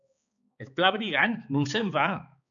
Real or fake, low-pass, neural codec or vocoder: fake; 7.2 kHz; codec, 16 kHz, 4 kbps, X-Codec, HuBERT features, trained on balanced general audio